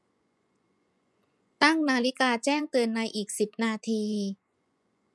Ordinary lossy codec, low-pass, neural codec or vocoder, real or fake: none; none; vocoder, 24 kHz, 100 mel bands, Vocos; fake